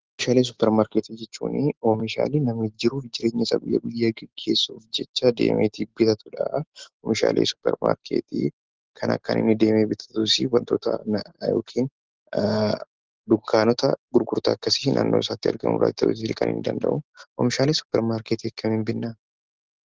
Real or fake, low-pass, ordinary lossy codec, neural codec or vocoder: real; 7.2 kHz; Opus, 16 kbps; none